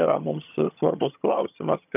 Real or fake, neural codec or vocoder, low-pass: fake; vocoder, 22.05 kHz, 80 mel bands, HiFi-GAN; 3.6 kHz